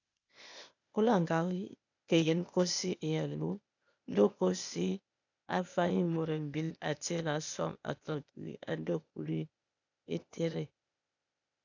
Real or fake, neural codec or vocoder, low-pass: fake; codec, 16 kHz, 0.8 kbps, ZipCodec; 7.2 kHz